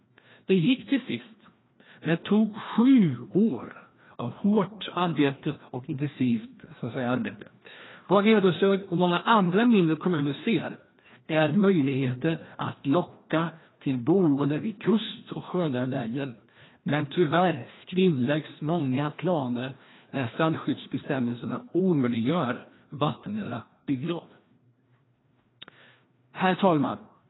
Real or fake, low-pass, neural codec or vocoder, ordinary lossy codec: fake; 7.2 kHz; codec, 16 kHz, 1 kbps, FreqCodec, larger model; AAC, 16 kbps